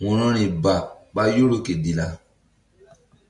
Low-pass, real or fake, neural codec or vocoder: 10.8 kHz; real; none